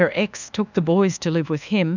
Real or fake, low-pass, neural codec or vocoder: fake; 7.2 kHz; codec, 24 kHz, 1.2 kbps, DualCodec